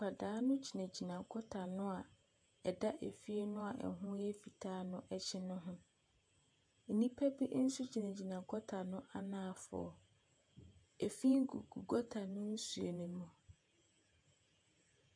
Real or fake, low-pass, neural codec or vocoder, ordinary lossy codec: fake; 9.9 kHz; vocoder, 48 kHz, 128 mel bands, Vocos; MP3, 64 kbps